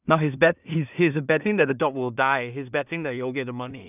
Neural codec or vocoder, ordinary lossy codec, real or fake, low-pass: codec, 16 kHz in and 24 kHz out, 0.4 kbps, LongCat-Audio-Codec, two codebook decoder; none; fake; 3.6 kHz